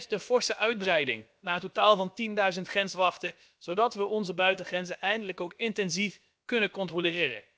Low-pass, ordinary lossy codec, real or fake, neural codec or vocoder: none; none; fake; codec, 16 kHz, about 1 kbps, DyCAST, with the encoder's durations